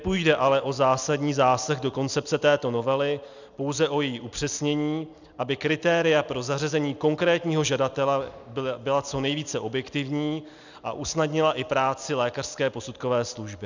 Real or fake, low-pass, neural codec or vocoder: real; 7.2 kHz; none